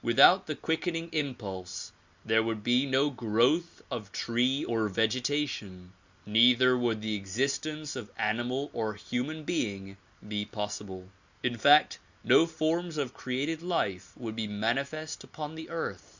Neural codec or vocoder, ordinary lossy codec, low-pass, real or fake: none; Opus, 64 kbps; 7.2 kHz; real